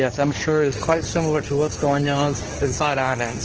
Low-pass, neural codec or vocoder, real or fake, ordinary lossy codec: 7.2 kHz; codec, 24 kHz, 0.9 kbps, WavTokenizer, medium speech release version 2; fake; Opus, 16 kbps